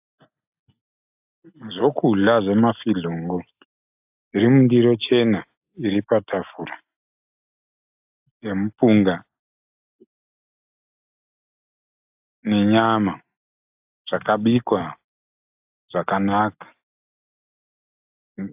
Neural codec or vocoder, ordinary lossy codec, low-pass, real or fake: none; AAC, 32 kbps; 3.6 kHz; real